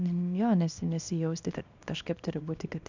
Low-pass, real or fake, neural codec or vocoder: 7.2 kHz; fake; codec, 24 kHz, 0.9 kbps, WavTokenizer, small release